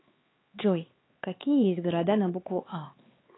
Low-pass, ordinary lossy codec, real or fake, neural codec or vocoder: 7.2 kHz; AAC, 16 kbps; fake; codec, 16 kHz, 4 kbps, X-Codec, HuBERT features, trained on LibriSpeech